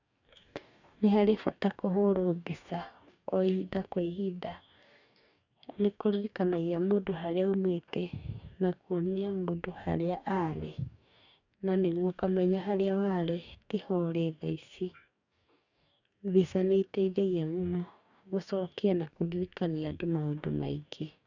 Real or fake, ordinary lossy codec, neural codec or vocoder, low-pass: fake; none; codec, 44.1 kHz, 2.6 kbps, DAC; 7.2 kHz